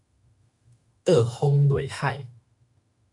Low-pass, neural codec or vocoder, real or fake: 10.8 kHz; autoencoder, 48 kHz, 32 numbers a frame, DAC-VAE, trained on Japanese speech; fake